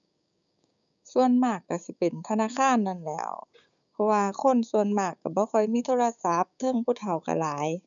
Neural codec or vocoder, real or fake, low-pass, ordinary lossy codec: codec, 16 kHz, 6 kbps, DAC; fake; 7.2 kHz; none